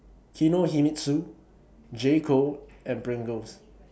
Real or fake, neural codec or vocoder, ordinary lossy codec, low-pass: real; none; none; none